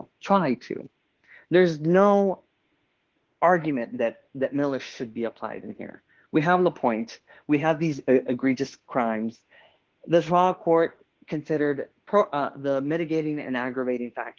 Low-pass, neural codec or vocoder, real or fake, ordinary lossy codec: 7.2 kHz; autoencoder, 48 kHz, 32 numbers a frame, DAC-VAE, trained on Japanese speech; fake; Opus, 16 kbps